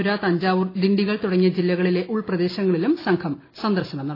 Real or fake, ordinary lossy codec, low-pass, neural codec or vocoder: real; AAC, 24 kbps; 5.4 kHz; none